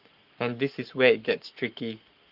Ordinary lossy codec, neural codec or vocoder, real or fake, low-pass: Opus, 24 kbps; codec, 44.1 kHz, 7.8 kbps, Pupu-Codec; fake; 5.4 kHz